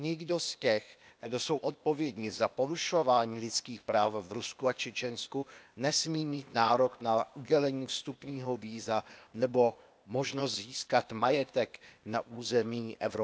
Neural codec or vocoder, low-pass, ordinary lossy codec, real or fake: codec, 16 kHz, 0.8 kbps, ZipCodec; none; none; fake